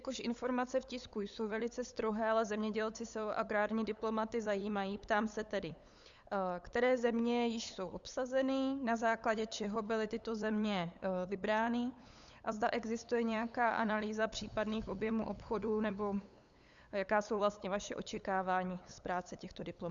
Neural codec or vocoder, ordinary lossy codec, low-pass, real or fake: codec, 16 kHz, 8 kbps, FunCodec, trained on LibriTTS, 25 frames a second; Opus, 64 kbps; 7.2 kHz; fake